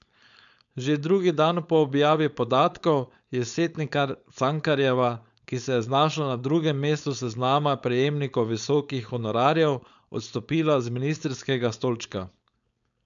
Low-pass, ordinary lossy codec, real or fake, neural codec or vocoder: 7.2 kHz; none; fake; codec, 16 kHz, 4.8 kbps, FACodec